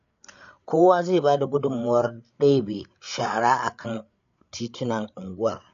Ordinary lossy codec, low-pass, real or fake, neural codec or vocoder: AAC, 48 kbps; 7.2 kHz; fake; codec, 16 kHz, 8 kbps, FreqCodec, larger model